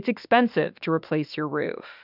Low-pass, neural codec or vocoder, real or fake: 5.4 kHz; autoencoder, 48 kHz, 32 numbers a frame, DAC-VAE, trained on Japanese speech; fake